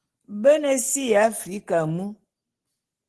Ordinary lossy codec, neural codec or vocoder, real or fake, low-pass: Opus, 16 kbps; none; real; 10.8 kHz